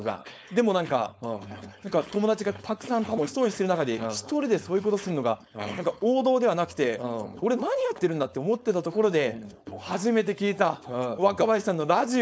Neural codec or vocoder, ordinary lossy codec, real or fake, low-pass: codec, 16 kHz, 4.8 kbps, FACodec; none; fake; none